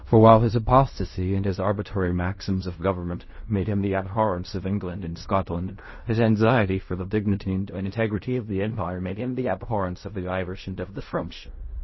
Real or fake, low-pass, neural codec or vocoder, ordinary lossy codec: fake; 7.2 kHz; codec, 16 kHz in and 24 kHz out, 0.4 kbps, LongCat-Audio-Codec, fine tuned four codebook decoder; MP3, 24 kbps